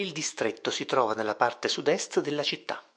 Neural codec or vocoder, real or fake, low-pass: autoencoder, 48 kHz, 128 numbers a frame, DAC-VAE, trained on Japanese speech; fake; 9.9 kHz